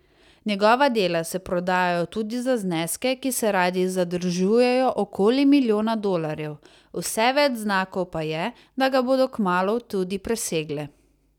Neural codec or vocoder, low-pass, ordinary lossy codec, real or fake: none; 19.8 kHz; none; real